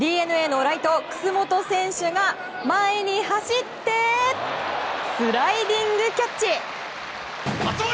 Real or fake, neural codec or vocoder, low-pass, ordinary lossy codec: real; none; none; none